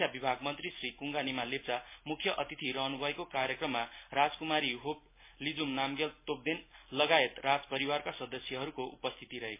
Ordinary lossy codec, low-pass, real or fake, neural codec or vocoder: MP3, 24 kbps; 3.6 kHz; real; none